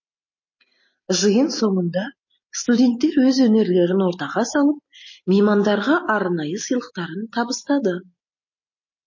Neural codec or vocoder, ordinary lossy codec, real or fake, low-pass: none; MP3, 32 kbps; real; 7.2 kHz